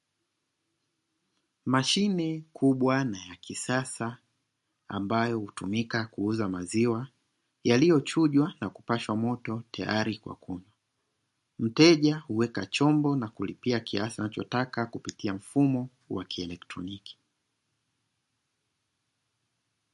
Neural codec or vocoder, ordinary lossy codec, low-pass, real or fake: none; MP3, 48 kbps; 14.4 kHz; real